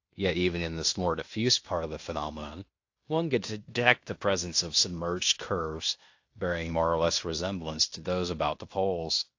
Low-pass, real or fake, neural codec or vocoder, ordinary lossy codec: 7.2 kHz; fake; codec, 16 kHz in and 24 kHz out, 0.9 kbps, LongCat-Audio-Codec, fine tuned four codebook decoder; AAC, 48 kbps